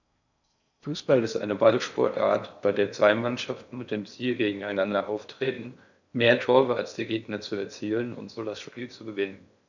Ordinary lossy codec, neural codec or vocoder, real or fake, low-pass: none; codec, 16 kHz in and 24 kHz out, 0.6 kbps, FocalCodec, streaming, 4096 codes; fake; 7.2 kHz